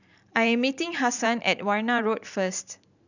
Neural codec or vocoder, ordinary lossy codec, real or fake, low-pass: vocoder, 44.1 kHz, 128 mel bands every 512 samples, BigVGAN v2; none; fake; 7.2 kHz